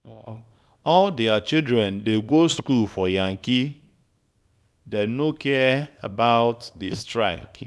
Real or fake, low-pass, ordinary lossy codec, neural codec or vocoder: fake; none; none; codec, 24 kHz, 0.9 kbps, WavTokenizer, small release